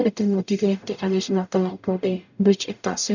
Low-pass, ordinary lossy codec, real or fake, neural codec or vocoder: 7.2 kHz; none; fake; codec, 44.1 kHz, 0.9 kbps, DAC